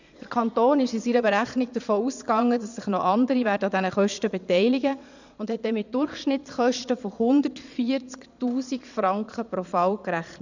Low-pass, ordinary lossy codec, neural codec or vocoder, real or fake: 7.2 kHz; none; vocoder, 22.05 kHz, 80 mel bands, Vocos; fake